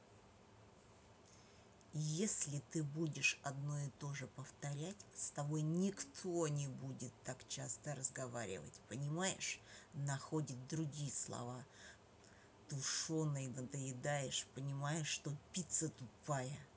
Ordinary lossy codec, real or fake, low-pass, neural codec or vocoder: none; real; none; none